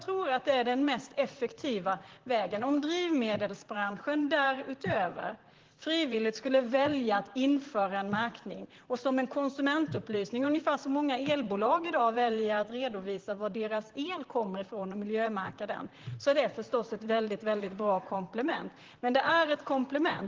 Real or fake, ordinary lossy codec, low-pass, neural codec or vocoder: fake; Opus, 16 kbps; 7.2 kHz; vocoder, 44.1 kHz, 128 mel bands, Pupu-Vocoder